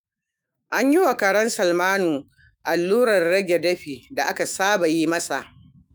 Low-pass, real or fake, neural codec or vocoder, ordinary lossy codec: none; fake; autoencoder, 48 kHz, 128 numbers a frame, DAC-VAE, trained on Japanese speech; none